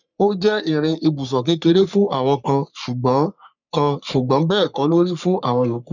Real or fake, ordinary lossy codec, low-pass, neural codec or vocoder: fake; none; 7.2 kHz; codec, 44.1 kHz, 3.4 kbps, Pupu-Codec